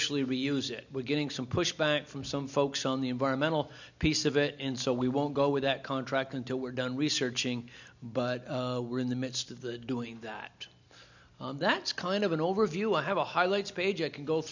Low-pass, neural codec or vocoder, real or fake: 7.2 kHz; none; real